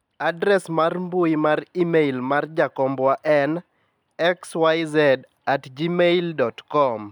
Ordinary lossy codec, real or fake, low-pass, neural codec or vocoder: none; real; 19.8 kHz; none